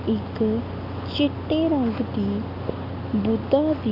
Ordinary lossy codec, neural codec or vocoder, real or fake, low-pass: none; none; real; 5.4 kHz